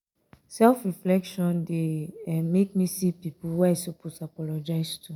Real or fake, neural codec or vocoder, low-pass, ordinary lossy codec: real; none; none; none